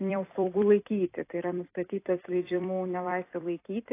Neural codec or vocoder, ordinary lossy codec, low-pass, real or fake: vocoder, 44.1 kHz, 128 mel bands every 256 samples, BigVGAN v2; AAC, 24 kbps; 3.6 kHz; fake